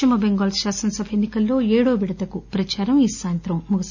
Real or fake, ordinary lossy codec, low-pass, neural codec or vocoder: real; none; 7.2 kHz; none